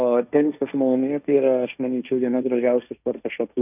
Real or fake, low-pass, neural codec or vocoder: fake; 3.6 kHz; codec, 16 kHz, 1.1 kbps, Voila-Tokenizer